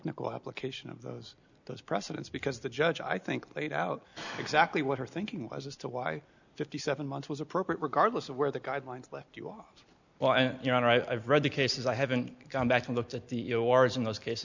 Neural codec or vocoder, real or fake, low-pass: none; real; 7.2 kHz